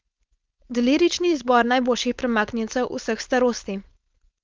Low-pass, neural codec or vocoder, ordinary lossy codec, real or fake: 7.2 kHz; codec, 16 kHz, 4.8 kbps, FACodec; Opus, 24 kbps; fake